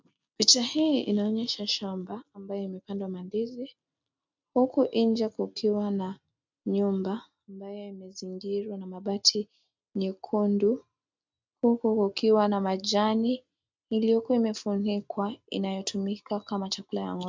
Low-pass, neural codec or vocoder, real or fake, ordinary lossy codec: 7.2 kHz; none; real; MP3, 64 kbps